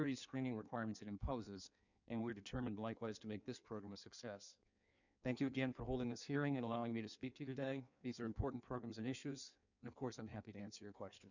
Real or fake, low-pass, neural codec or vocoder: fake; 7.2 kHz; codec, 16 kHz in and 24 kHz out, 1.1 kbps, FireRedTTS-2 codec